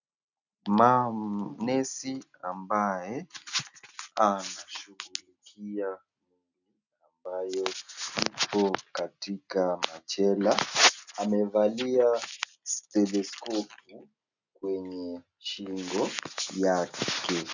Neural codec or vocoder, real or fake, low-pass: none; real; 7.2 kHz